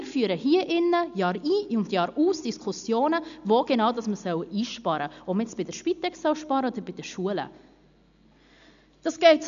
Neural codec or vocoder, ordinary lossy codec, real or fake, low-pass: none; none; real; 7.2 kHz